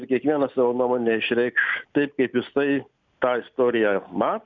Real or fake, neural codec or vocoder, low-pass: real; none; 7.2 kHz